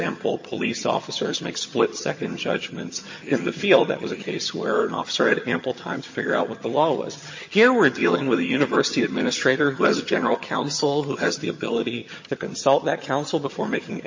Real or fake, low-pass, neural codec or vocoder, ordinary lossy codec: fake; 7.2 kHz; vocoder, 22.05 kHz, 80 mel bands, HiFi-GAN; MP3, 32 kbps